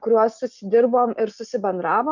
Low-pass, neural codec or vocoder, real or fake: 7.2 kHz; none; real